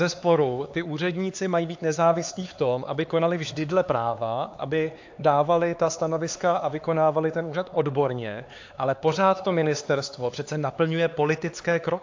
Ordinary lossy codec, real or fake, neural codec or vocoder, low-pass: AAC, 48 kbps; fake; codec, 16 kHz, 4 kbps, X-Codec, HuBERT features, trained on LibriSpeech; 7.2 kHz